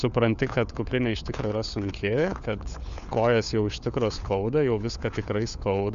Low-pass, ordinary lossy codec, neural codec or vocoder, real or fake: 7.2 kHz; Opus, 64 kbps; codec, 16 kHz, 4 kbps, FunCodec, trained on LibriTTS, 50 frames a second; fake